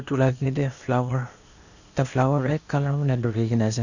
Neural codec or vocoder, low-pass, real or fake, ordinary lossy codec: codec, 16 kHz, 0.8 kbps, ZipCodec; 7.2 kHz; fake; none